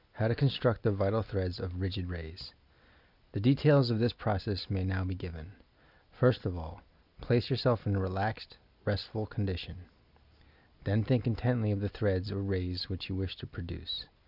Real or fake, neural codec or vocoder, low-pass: real; none; 5.4 kHz